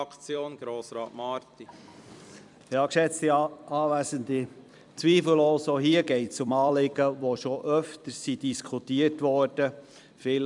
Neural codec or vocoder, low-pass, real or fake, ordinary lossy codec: vocoder, 44.1 kHz, 128 mel bands every 256 samples, BigVGAN v2; 10.8 kHz; fake; none